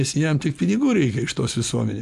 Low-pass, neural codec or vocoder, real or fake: 14.4 kHz; none; real